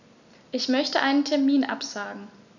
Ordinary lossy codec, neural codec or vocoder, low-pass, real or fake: none; none; 7.2 kHz; real